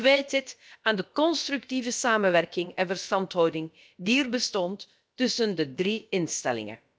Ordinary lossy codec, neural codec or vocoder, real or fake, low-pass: none; codec, 16 kHz, about 1 kbps, DyCAST, with the encoder's durations; fake; none